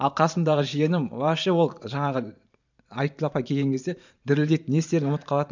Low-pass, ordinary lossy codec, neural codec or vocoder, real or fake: 7.2 kHz; none; vocoder, 44.1 kHz, 128 mel bands every 512 samples, BigVGAN v2; fake